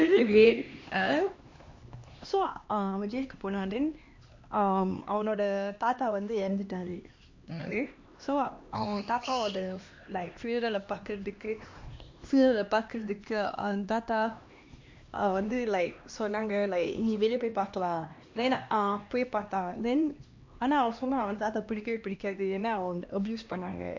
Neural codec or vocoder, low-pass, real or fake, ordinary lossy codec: codec, 16 kHz, 2 kbps, X-Codec, HuBERT features, trained on LibriSpeech; 7.2 kHz; fake; MP3, 48 kbps